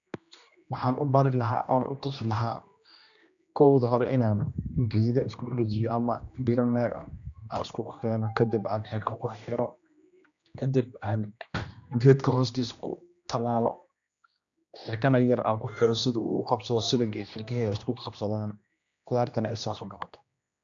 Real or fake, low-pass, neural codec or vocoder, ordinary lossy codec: fake; 7.2 kHz; codec, 16 kHz, 1 kbps, X-Codec, HuBERT features, trained on general audio; none